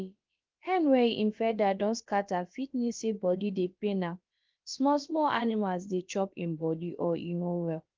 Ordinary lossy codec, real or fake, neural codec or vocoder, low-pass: Opus, 32 kbps; fake; codec, 16 kHz, about 1 kbps, DyCAST, with the encoder's durations; 7.2 kHz